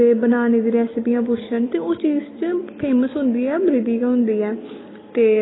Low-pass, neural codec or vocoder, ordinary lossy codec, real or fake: 7.2 kHz; none; AAC, 16 kbps; real